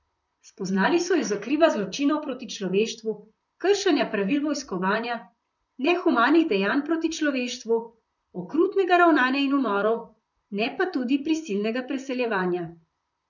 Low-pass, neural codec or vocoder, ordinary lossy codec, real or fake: 7.2 kHz; vocoder, 44.1 kHz, 128 mel bands, Pupu-Vocoder; none; fake